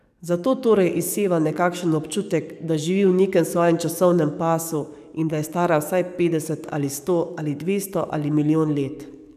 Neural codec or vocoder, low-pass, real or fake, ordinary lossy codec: codec, 44.1 kHz, 7.8 kbps, DAC; 14.4 kHz; fake; none